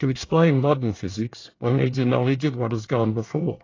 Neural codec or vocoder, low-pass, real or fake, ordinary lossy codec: codec, 24 kHz, 1 kbps, SNAC; 7.2 kHz; fake; AAC, 32 kbps